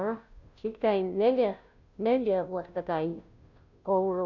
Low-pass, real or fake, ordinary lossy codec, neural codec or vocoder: 7.2 kHz; fake; none; codec, 16 kHz, 0.5 kbps, FunCodec, trained on Chinese and English, 25 frames a second